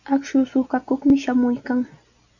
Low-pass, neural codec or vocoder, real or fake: 7.2 kHz; none; real